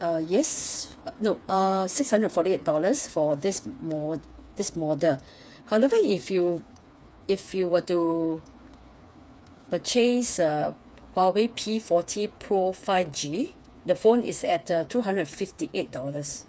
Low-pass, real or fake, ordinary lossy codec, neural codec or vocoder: none; fake; none; codec, 16 kHz, 4 kbps, FreqCodec, smaller model